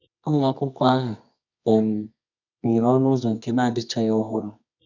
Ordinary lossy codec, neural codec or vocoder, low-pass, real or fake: none; codec, 24 kHz, 0.9 kbps, WavTokenizer, medium music audio release; 7.2 kHz; fake